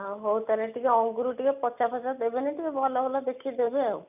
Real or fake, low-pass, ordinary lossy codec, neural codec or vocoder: real; 3.6 kHz; none; none